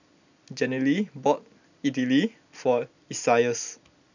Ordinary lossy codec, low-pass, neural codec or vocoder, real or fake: none; 7.2 kHz; none; real